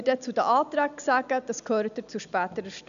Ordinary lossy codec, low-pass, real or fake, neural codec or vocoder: none; 7.2 kHz; real; none